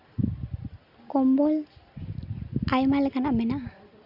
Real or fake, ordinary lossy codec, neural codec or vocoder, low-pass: real; none; none; 5.4 kHz